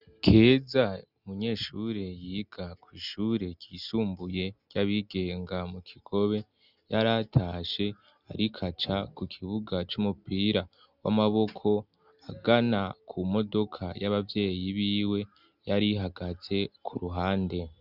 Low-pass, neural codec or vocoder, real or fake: 5.4 kHz; none; real